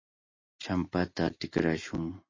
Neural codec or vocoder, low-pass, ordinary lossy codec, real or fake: none; 7.2 kHz; MP3, 32 kbps; real